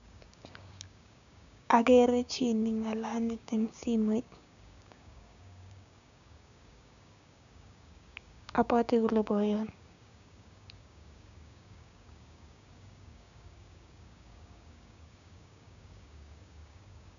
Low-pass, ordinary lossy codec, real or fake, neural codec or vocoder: 7.2 kHz; MP3, 96 kbps; fake; codec, 16 kHz, 6 kbps, DAC